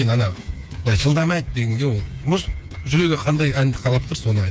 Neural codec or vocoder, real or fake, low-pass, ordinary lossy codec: codec, 16 kHz, 4 kbps, FreqCodec, smaller model; fake; none; none